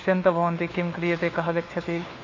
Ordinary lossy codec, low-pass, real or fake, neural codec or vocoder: AAC, 32 kbps; 7.2 kHz; fake; codec, 16 kHz, 4 kbps, FunCodec, trained on LibriTTS, 50 frames a second